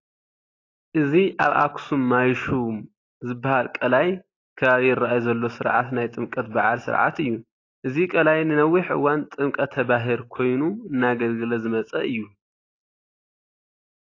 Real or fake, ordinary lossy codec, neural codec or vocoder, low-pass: real; AAC, 32 kbps; none; 7.2 kHz